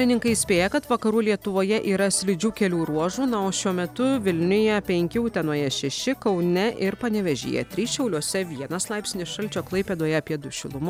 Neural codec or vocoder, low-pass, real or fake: none; 19.8 kHz; real